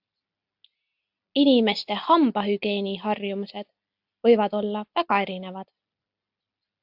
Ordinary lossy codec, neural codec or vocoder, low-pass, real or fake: AAC, 48 kbps; none; 5.4 kHz; real